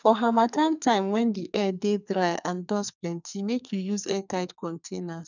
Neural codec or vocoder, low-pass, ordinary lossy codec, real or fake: codec, 44.1 kHz, 2.6 kbps, SNAC; 7.2 kHz; none; fake